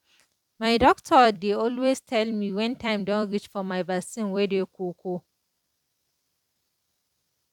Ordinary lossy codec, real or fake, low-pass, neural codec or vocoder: none; fake; 19.8 kHz; vocoder, 44.1 kHz, 128 mel bands every 256 samples, BigVGAN v2